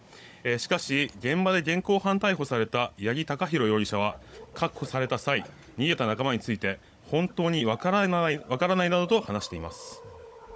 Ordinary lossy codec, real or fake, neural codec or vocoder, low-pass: none; fake; codec, 16 kHz, 16 kbps, FunCodec, trained on Chinese and English, 50 frames a second; none